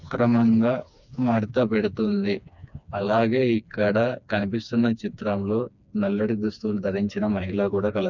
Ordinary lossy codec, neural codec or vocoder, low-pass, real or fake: none; codec, 16 kHz, 2 kbps, FreqCodec, smaller model; 7.2 kHz; fake